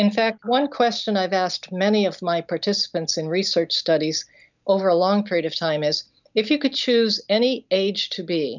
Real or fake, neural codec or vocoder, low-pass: real; none; 7.2 kHz